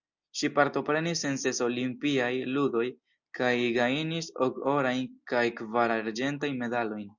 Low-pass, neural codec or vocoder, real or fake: 7.2 kHz; none; real